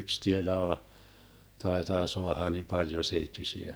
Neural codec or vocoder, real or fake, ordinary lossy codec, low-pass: codec, 44.1 kHz, 2.6 kbps, SNAC; fake; none; none